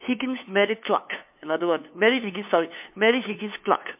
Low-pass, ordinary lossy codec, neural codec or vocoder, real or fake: 3.6 kHz; MP3, 32 kbps; codec, 16 kHz, 2 kbps, FunCodec, trained on LibriTTS, 25 frames a second; fake